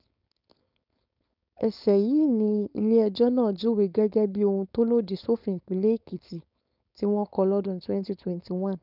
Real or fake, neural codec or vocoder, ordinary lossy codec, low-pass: fake; codec, 16 kHz, 4.8 kbps, FACodec; none; 5.4 kHz